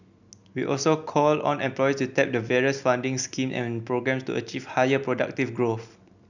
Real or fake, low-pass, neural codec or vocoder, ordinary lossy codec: real; 7.2 kHz; none; none